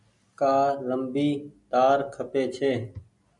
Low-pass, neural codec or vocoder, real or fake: 10.8 kHz; none; real